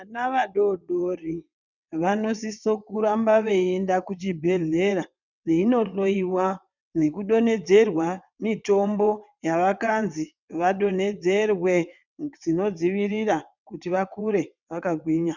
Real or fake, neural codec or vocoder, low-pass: fake; vocoder, 22.05 kHz, 80 mel bands, WaveNeXt; 7.2 kHz